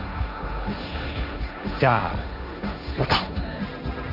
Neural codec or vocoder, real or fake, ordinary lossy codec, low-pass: codec, 16 kHz, 1.1 kbps, Voila-Tokenizer; fake; none; 5.4 kHz